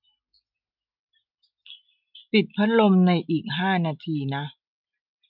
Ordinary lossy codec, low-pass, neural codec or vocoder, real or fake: none; 5.4 kHz; none; real